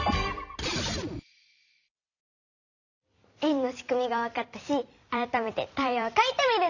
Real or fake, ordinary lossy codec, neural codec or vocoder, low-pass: fake; none; vocoder, 44.1 kHz, 128 mel bands every 256 samples, BigVGAN v2; 7.2 kHz